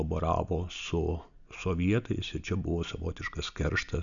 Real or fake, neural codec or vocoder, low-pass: real; none; 7.2 kHz